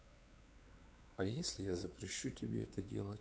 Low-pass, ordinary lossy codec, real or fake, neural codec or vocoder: none; none; fake; codec, 16 kHz, 4 kbps, X-Codec, WavLM features, trained on Multilingual LibriSpeech